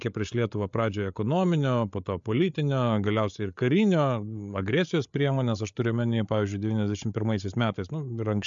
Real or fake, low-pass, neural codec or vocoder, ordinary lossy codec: fake; 7.2 kHz; codec, 16 kHz, 8 kbps, FreqCodec, larger model; MP3, 64 kbps